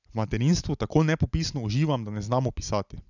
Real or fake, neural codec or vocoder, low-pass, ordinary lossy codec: real; none; 7.2 kHz; none